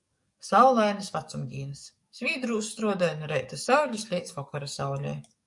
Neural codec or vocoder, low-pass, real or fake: codec, 44.1 kHz, 7.8 kbps, DAC; 10.8 kHz; fake